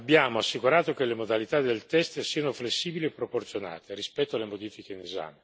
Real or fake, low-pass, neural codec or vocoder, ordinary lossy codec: real; none; none; none